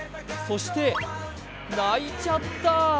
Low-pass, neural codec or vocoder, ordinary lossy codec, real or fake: none; none; none; real